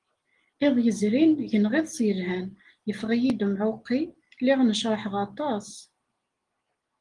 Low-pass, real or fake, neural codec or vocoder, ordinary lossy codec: 10.8 kHz; real; none; Opus, 24 kbps